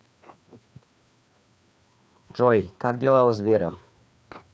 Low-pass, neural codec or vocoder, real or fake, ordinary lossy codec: none; codec, 16 kHz, 2 kbps, FreqCodec, larger model; fake; none